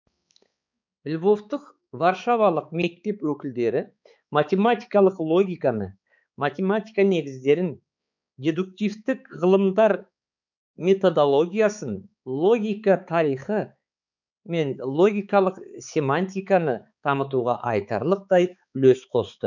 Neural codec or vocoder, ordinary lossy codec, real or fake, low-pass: codec, 16 kHz, 4 kbps, X-Codec, HuBERT features, trained on balanced general audio; none; fake; 7.2 kHz